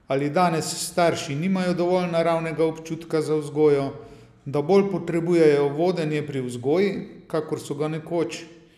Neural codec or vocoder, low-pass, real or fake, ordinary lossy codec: none; 14.4 kHz; real; none